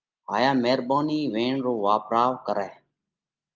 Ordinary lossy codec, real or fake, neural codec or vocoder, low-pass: Opus, 24 kbps; real; none; 7.2 kHz